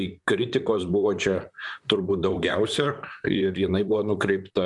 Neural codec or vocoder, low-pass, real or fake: vocoder, 44.1 kHz, 128 mel bands, Pupu-Vocoder; 10.8 kHz; fake